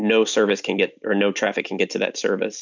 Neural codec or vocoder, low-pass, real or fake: none; 7.2 kHz; real